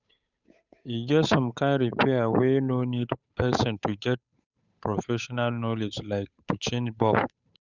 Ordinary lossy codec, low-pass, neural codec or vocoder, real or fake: none; 7.2 kHz; codec, 16 kHz, 8 kbps, FunCodec, trained on Chinese and English, 25 frames a second; fake